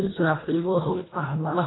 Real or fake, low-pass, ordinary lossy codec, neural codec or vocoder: fake; 7.2 kHz; AAC, 16 kbps; codec, 24 kHz, 1.5 kbps, HILCodec